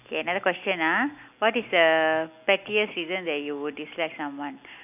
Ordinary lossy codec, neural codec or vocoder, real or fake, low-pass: none; none; real; 3.6 kHz